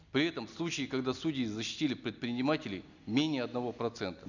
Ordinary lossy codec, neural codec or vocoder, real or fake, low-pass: none; none; real; 7.2 kHz